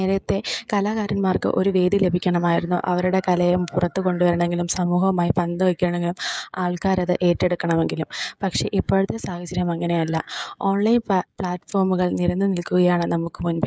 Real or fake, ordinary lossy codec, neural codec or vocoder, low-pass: fake; none; codec, 16 kHz, 16 kbps, FreqCodec, smaller model; none